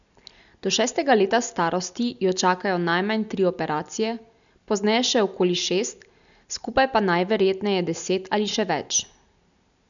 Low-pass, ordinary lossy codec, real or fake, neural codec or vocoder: 7.2 kHz; none; real; none